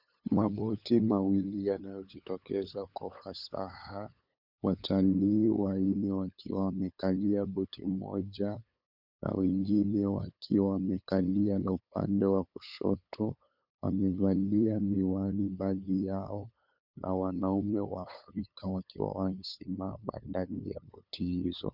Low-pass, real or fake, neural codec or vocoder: 5.4 kHz; fake; codec, 16 kHz, 8 kbps, FunCodec, trained on LibriTTS, 25 frames a second